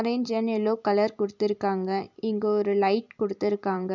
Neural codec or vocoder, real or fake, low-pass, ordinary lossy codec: vocoder, 44.1 kHz, 128 mel bands every 256 samples, BigVGAN v2; fake; 7.2 kHz; none